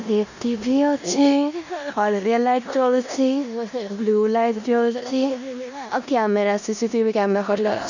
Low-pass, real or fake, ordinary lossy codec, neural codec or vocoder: 7.2 kHz; fake; none; codec, 16 kHz in and 24 kHz out, 0.9 kbps, LongCat-Audio-Codec, four codebook decoder